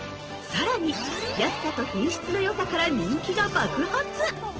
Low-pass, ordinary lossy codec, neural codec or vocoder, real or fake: 7.2 kHz; Opus, 16 kbps; none; real